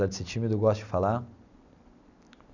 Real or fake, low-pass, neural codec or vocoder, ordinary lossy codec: real; 7.2 kHz; none; none